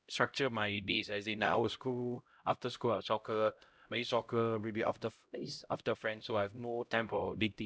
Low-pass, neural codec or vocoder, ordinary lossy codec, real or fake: none; codec, 16 kHz, 0.5 kbps, X-Codec, HuBERT features, trained on LibriSpeech; none; fake